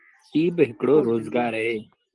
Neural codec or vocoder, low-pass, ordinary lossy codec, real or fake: none; 10.8 kHz; Opus, 24 kbps; real